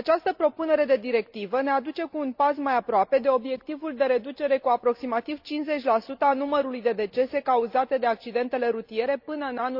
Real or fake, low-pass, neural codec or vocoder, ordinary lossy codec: real; 5.4 kHz; none; Opus, 64 kbps